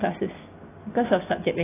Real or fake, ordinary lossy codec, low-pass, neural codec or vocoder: real; AAC, 16 kbps; 3.6 kHz; none